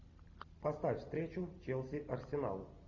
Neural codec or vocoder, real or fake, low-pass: none; real; 7.2 kHz